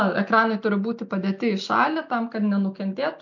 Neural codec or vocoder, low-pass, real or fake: none; 7.2 kHz; real